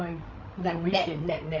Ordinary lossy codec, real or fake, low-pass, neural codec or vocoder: MP3, 48 kbps; fake; 7.2 kHz; codec, 16 kHz, 16 kbps, FreqCodec, larger model